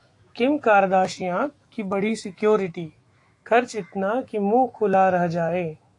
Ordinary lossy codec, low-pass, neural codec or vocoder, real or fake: AAC, 48 kbps; 10.8 kHz; autoencoder, 48 kHz, 128 numbers a frame, DAC-VAE, trained on Japanese speech; fake